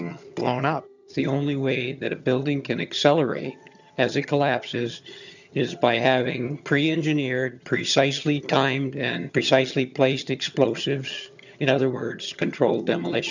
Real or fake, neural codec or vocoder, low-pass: fake; vocoder, 22.05 kHz, 80 mel bands, HiFi-GAN; 7.2 kHz